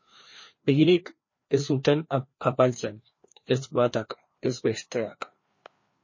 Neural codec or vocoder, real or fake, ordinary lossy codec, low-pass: codec, 16 kHz, 2 kbps, FreqCodec, larger model; fake; MP3, 32 kbps; 7.2 kHz